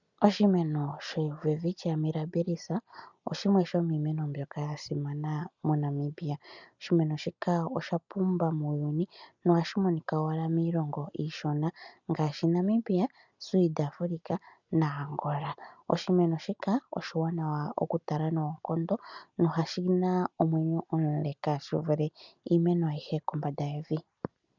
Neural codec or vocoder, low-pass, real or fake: none; 7.2 kHz; real